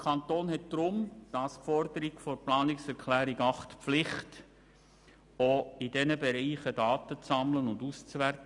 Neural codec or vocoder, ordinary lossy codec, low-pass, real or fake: none; none; 10.8 kHz; real